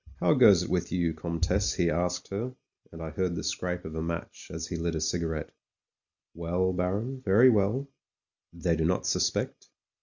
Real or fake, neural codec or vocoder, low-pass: real; none; 7.2 kHz